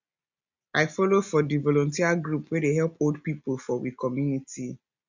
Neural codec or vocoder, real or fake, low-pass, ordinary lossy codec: none; real; 7.2 kHz; none